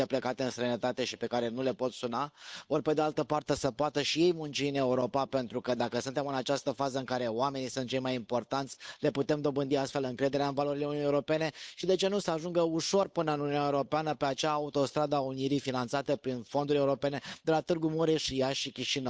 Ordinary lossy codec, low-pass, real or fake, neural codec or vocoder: none; none; fake; codec, 16 kHz, 8 kbps, FunCodec, trained on Chinese and English, 25 frames a second